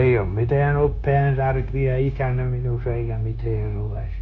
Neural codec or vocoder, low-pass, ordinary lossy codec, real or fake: codec, 16 kHz, 0.9 kbps, LongCat-Audio-Codec; 7.2 kHz; none; fake